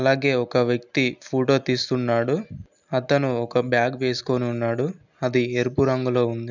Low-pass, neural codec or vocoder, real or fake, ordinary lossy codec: 7.2 kHz; none; real; none